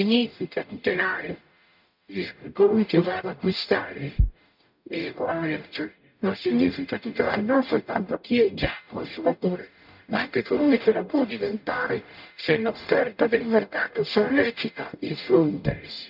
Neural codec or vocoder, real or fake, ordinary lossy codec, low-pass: codec, 44.1 kHz, 0.9 kbps, DAC; fake; none; 5.4 kHz